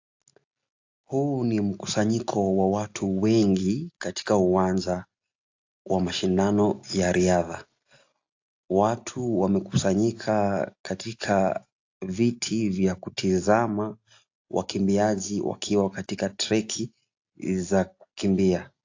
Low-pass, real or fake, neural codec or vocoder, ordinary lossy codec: 7.2 kHz; real; none; AAC, 48 kbps